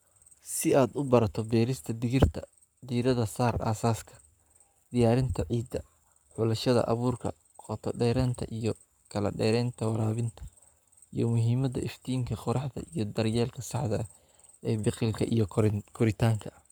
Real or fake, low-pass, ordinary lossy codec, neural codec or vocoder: fake; none; none; codec, 44.1 kHz, 7.8 kbps, Pupu-Codec